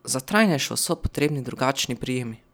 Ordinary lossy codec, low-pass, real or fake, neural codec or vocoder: none; none; real; none